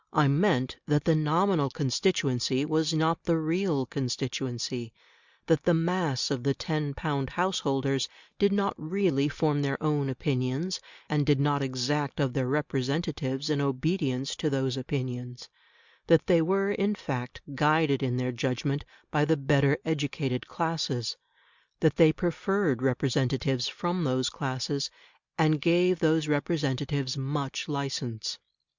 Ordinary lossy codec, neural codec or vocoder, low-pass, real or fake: Opus, 64 kbps; none; 7.2 kHz; real